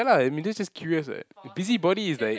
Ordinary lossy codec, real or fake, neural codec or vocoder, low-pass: none; real; none; none